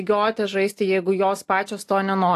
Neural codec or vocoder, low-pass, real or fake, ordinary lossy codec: none; 14.4 kHz; real; AAC, 64 kbps